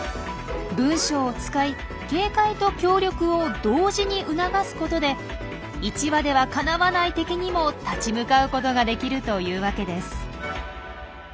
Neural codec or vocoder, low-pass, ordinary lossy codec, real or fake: none; none; none; real